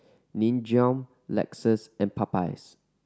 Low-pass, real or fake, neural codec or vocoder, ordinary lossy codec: none; real; none; none